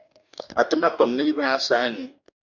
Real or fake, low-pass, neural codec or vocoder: fake; 7.2 kHz; codec, 44.1 kHz, 2.6 kbps, DAC